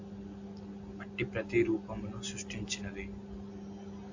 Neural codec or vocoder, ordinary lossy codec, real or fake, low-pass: none; AAC, 48 kbps; real; 7.2 kHz